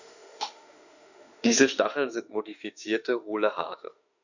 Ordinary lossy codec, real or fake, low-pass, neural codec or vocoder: none; fake; 7.2 kHz; autoencoder, 48 kHz, 32 numbers a frame, DAC-VAE, trained on Japanese speech